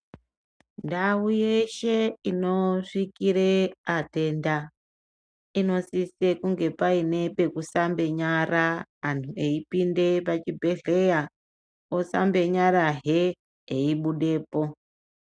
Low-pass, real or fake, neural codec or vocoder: 9.9 kHz; real; none